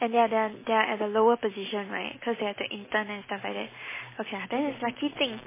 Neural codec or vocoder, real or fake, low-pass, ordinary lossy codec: none; real; 3.6 kHz; MP3, 16 kbps